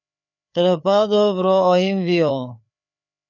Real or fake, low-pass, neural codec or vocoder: fake; 7.2 kHz; codec, 16 kHz, 4 kbps, FreqCodec, larger model